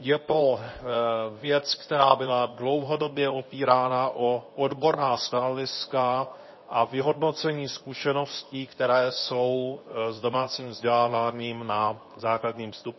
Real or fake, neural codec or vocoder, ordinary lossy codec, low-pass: fake; codec, 24 kHz, 0.9 kbps, WavTokenizer, medium speech release version 2; MP3, 24 kbps; 7.2 kHz